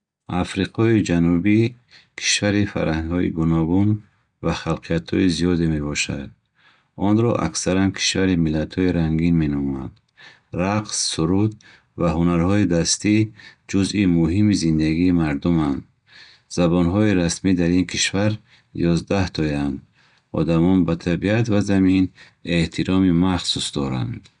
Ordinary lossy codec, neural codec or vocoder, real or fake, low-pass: none; none; real; 9.9 kHz